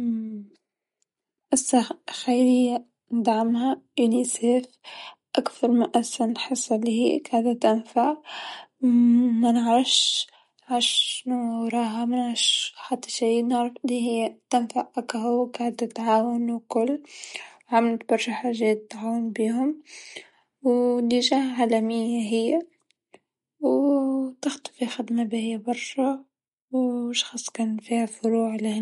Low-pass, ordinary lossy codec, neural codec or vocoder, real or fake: 19.8 kHz; MP3, 48 kbps; none; real